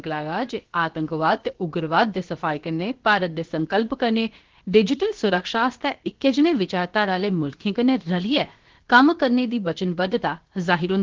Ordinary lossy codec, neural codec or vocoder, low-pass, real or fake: Opus, 16 kbps; codec, 16 kHz, about 1 kbps, DyCAST, with the encoder's durations; 7.2 kHz; fake